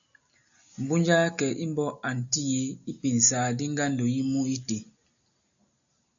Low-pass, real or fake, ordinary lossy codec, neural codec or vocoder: 7.2 kHz; real; AAC, 64 kbps; none